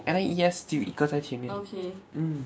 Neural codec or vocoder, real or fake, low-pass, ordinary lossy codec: codec, 16 kHz, 6 kbps, DAC; fake; none; none